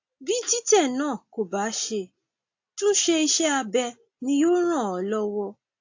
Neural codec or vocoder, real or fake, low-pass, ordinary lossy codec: none; real; 7.2 kHz; AAC, 48 kbps